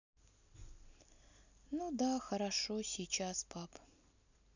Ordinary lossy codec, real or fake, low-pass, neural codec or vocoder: none; real; 7.2 kHz; none